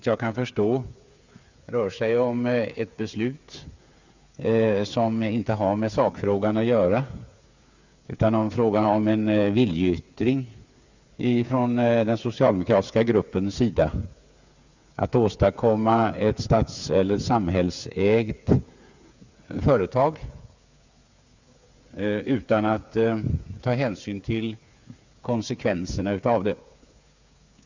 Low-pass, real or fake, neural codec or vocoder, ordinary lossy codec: 7.2 kHz; fake; codec, 16 kHz, 8 kbps, FreqCodec, smaller model; Opus, 64 kbps